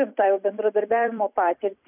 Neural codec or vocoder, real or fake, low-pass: vocoder, 44.1 kHz, 128 mel bands every 256 samples, BigVGAN v2; fake; 3.6 kHz